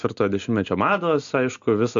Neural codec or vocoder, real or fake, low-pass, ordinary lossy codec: none; real; 7.2 kHz; MP3, 64 kbps